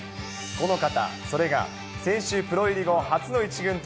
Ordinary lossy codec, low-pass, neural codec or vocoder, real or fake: none; none; none; real